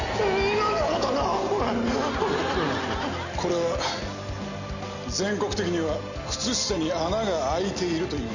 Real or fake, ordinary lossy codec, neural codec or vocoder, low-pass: real; none; none; 7.2 kHz